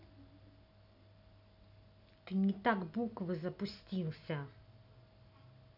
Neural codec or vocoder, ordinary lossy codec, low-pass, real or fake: none; none; 5.4 kHz; real